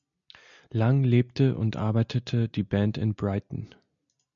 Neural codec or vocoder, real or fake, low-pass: none; real; 7.2 kHz